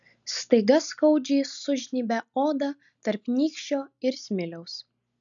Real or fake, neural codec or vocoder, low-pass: real; none; 7.2 kHz